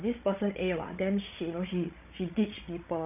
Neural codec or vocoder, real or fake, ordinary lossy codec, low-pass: codec, 16 kHz, 16 kbps, FreqCodec, larger model; fake; none; 3.6 kHz